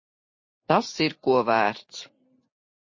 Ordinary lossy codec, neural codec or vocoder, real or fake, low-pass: MP3, 32 kbps; none; real; 7.2 kHz